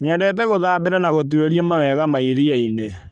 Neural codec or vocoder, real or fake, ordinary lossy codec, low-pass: codec, 44.1 kHz, 3.4 kbps, Pupu-Codec; fake; none; 9.9 kHz